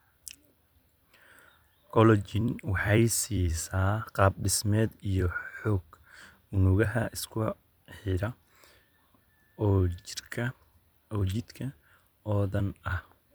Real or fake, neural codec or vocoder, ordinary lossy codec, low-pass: fake; vocoder, 44.1 kHz, 128 mel bands every 256 samples, BigVGAN v2; none; none